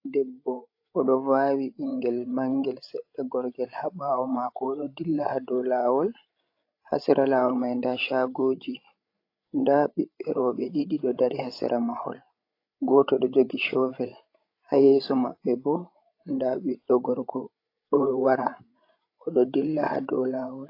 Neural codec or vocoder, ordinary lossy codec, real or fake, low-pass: codec, 16 kHz, 16 kbps, FreqCodec, larger model; AAC, 32 kbps; fake; 5.4 kHz